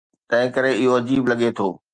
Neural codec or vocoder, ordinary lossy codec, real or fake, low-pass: none; Opus, 32 kbps; real; 9.9 kHz